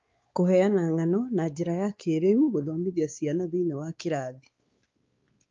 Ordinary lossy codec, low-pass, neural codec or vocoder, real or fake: Opus, 32 kbps; 7.2 kHz; codec, 16 kHz, 4 kbps, X-Codec, WavLM features, trained on Multilingual LibriSpeech; fake